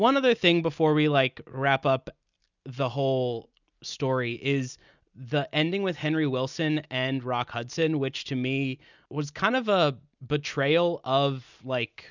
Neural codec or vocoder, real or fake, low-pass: none; real; 7.2 kHz